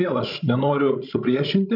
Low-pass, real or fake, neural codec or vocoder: 5.4 kHz; fake; codec, 16 kHz, 16 kbps, FreqCodec, larger model